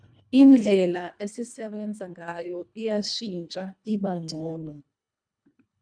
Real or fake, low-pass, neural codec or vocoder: fake; 9.9 kHz; codec, 24 kHz, 1.5 kbps, HILCodec